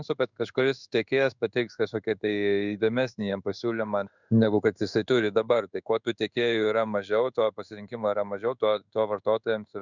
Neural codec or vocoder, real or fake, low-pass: codec, 16 kHz in and 24 kHz out, 1 kbps, XY-Tokenizer; fake; 7.2 kHz